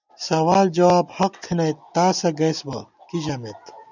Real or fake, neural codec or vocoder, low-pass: real; none; 7.2 kHz